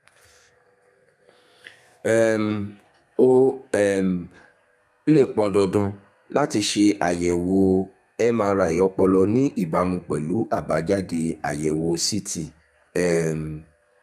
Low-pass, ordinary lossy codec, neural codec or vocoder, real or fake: 14.4 kHz; none; codec, 32 kHz, 1.9 kbps, SNAC; fake